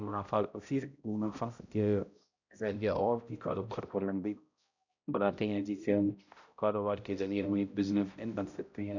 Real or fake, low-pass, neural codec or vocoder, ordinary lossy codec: fake; 7.2 kHz; codec, 16 kHz, 0.5 kbps, X-Codec, HuBERT features, trained on balanced general audio; none